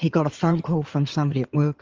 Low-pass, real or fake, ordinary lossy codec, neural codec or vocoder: 7.2 kHz; fake; Opus, 32 kbps; codec, 16 kHz in and 24 kHz out, 2.2 kbps, FireRedTTS-2 codec